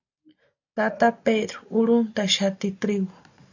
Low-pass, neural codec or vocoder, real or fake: 7.2 kHz; none; real